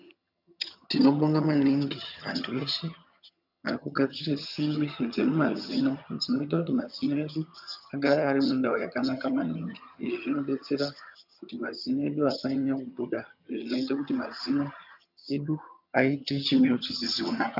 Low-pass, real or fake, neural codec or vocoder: 5.4 kHz; fake; vocoder, 22.05 kHz, 80 mel bands, HiFi-GAN